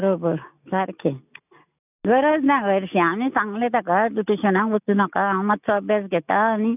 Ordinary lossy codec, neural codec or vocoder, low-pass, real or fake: none; none; 3.6 kHz; real